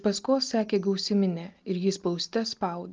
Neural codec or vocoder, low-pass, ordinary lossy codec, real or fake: none; 7.2 kHz; Opus, 24 kbps; real